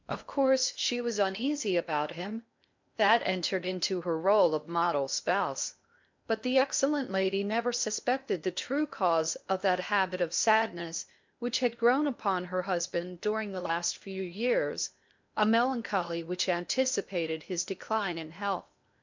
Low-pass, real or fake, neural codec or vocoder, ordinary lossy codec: 7.2 kHz; fake; codec, 16 kHz in and 24 kHz out, 0.8 kbps, FocalCodec, streaming, 65536 codes; MP3, 64 kbps